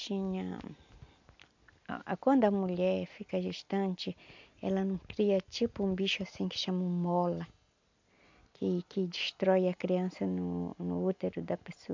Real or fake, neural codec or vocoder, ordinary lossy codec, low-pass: real; none; MP3, 64 kbps; 7.2 kHz